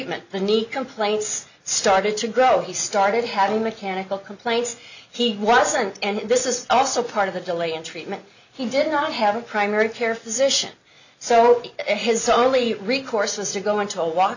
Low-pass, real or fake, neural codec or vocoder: 7.2 kHz; real; none